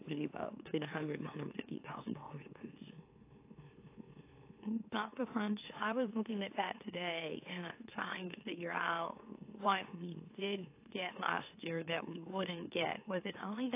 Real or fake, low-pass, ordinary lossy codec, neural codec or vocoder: fake; 3.6 kHz; AAC, 24 kbps; autoencoder, 44.1 kHz, a latent of 192 numbers a frame, MeloTTS